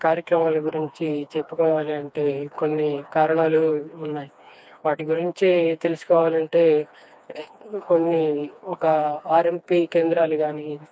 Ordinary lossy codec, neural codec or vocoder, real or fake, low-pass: none; codec, 16 kHz, 2 kbps, FreqCodec, smaller model; fake; none